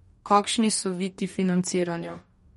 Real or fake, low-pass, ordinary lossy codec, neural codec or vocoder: fake; 19.8 kHz; MP3, 48 kbps; codec, 44.1 kHz, 2.6 kbps, DAC